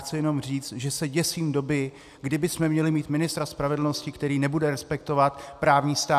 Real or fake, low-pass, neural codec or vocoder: real; 14.4 kHz; none